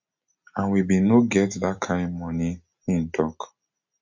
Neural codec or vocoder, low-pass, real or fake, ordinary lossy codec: none; 7.2 kHz; real; MP3, 48 kbps